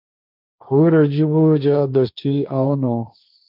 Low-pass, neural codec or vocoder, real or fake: 5.4 kHz; codec, 16 kHz, 1.1 kbps, Voila-Tokenizer; fake